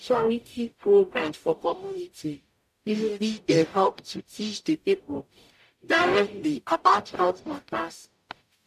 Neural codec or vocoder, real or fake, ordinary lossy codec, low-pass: codec, 44.1 kHz, 0.9 kbps, DAC; fake; AAC, 96 kbps; 14.4 kHz